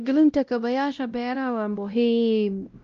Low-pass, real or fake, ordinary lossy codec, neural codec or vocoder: 7.2 kHz; fake; Opus, 24 kbps; codec, 16 kHz, 0.5 kbps, X-Codec, WavLM features, trained on Multilingual LibriSpeech